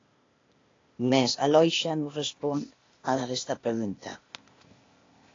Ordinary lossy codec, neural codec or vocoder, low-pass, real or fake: AAC, 32 kbps; codec, 16 kHz, 0.8 kbps, ZipCodec; 7.2 kHz; fake